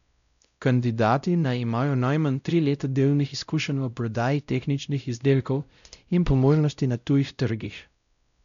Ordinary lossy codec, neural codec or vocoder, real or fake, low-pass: none; codec, 16 kHz, 0.5 kbps, X-Codec, WavLM features, trained on Multilingual LibriSpeech; fake; 7.2 kHz